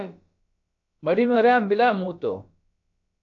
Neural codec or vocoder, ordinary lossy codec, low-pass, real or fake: codec, 16 kHz, about 1 kbps, DyCAST, with the encoder's durations; AAC, 48 kbps; 7.2 kHz; fake